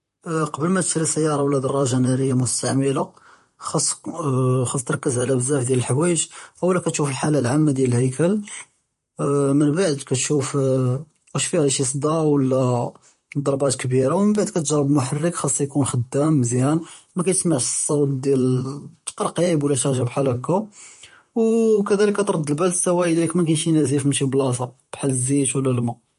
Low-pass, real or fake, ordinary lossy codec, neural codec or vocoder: 14.4 kHz; fake; MP3, 48 kbps; vocoder, 44.1 kHz, 128 mel bands, Pupu-Vocoder